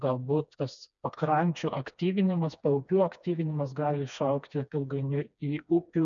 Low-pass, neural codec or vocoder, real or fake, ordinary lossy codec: 7.2 kHz; codec, 16 kHz, 2 kbps, FreqCodec, smaller model; fake; MP3, 96 kbps